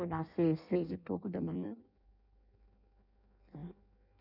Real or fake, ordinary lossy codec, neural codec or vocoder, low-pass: fake; none; codec, 16 kHz in and 24 kHz out, 0.6 kbps, FireRedTTS-2 codec; 5.4 kHz